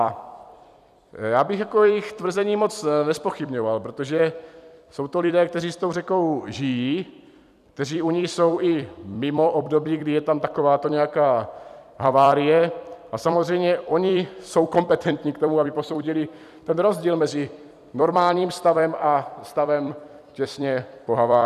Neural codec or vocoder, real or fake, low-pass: vocoder, 44.1 kHz, 128 mel bands every 256 samples, BigVGAN v2; fake; 14.4 kHz